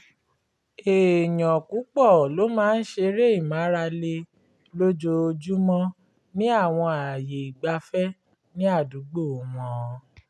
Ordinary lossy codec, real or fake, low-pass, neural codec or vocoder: none; real; none; none